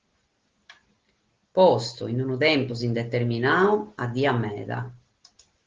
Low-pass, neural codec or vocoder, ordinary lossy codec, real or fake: 7.2 kHz; none; Opus, 16 kbps; real